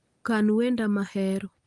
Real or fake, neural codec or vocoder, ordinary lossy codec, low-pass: real; none; Opus, 24 kbps; 10.8 kHz